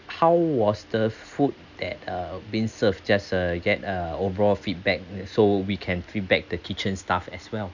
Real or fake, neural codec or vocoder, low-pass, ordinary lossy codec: real; none; 7.2 kHz; none